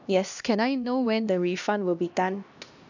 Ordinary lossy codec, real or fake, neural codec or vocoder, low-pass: none; fake; codec, 16 kHz, 1 kbps, X-Codec, HuBERT features, trained on LibriSpeech; 7.2 kHz